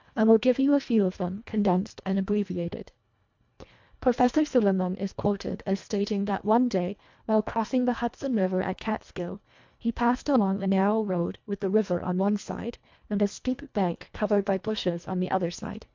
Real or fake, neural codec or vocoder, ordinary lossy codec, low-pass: fake; codec, 24 kHz, 1.5 kbps, HILCodec; MP3, 64 kbps; 7.2 kHz